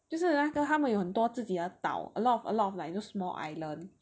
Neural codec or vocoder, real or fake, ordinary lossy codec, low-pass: none; real; none; none